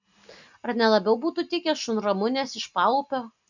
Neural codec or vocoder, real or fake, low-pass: none; real; 7.2 kHz